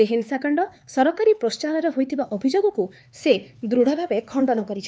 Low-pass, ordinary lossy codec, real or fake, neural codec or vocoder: none; none; fake; codec, 16 kHz, 4 kbps, X-Codec, HuBERT features, trained on LibriSpeech